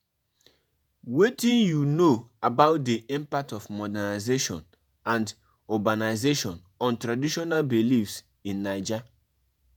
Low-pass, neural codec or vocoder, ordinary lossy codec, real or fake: none; vocoder, 48 kHz, 128 mel bands, Vocos; none; fake